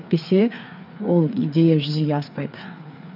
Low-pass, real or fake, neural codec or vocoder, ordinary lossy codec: 5.4 kHz; fake; codec, 16 kHz, 4 kbps, FreqCodec, larger model; none